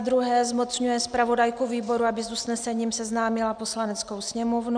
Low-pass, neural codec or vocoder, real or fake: 9.9 kHz; none; real